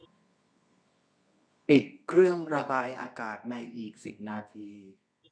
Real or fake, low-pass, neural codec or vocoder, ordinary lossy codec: fake; 9.9 kHz; codec, 24 kHz, 0.9 kbps, WavTokenizer, medium music audio release; none